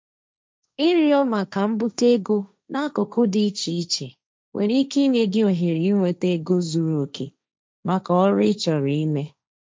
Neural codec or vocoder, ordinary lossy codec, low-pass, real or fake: codec, 16 kHz, 1.1 kbps, Voila-Tokenizer; none; none; fake